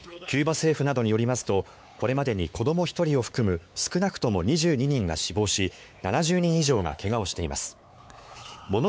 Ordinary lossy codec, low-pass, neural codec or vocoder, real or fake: none; none; codec, 16 kHz, 4 kbps, X-Codec, WavLM features, trained on Multilingual LibriSpeech; fake